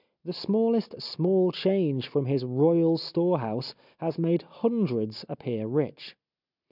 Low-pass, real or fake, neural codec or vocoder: 5.4 kHz; real; none